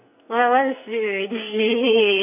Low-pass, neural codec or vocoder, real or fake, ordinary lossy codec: 3.6 kHz; codec, 44.1 kHz, 2.6 kbps, SNAC; fake; AAC, 32 kbps